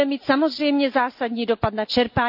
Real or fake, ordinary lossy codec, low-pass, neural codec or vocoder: real; AAC, 48 kbps; 5.4 kHz; none